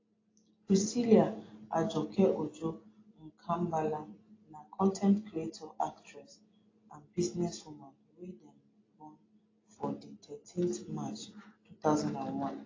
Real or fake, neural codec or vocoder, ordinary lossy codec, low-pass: real; none; AAC, 32 kbps; 7.2 kHz